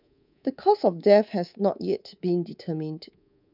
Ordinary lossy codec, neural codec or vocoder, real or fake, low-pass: none; codec, 24 kHz, 3.1 kbps, DualCodec; fake; 5.4 kHz